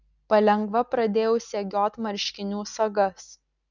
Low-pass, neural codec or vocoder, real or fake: 7.2 kHz; none; real